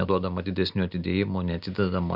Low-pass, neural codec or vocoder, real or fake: 5.4 kHz; none; real